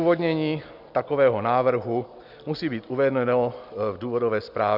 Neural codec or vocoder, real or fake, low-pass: none; real; 5.4 kHz